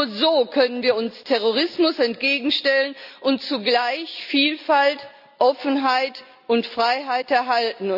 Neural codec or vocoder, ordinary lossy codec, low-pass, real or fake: none; none; 5.4 kHz; real